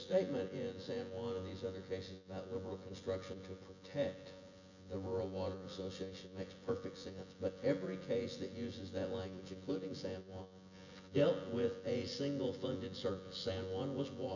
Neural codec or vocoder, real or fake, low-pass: vocoder, 24 kHz, 100 mel bands, Vocos; fake; 7.2 kHz